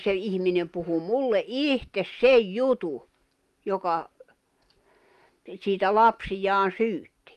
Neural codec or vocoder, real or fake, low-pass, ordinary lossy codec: none; real; 14.4 kHz; Opus, 32 kbps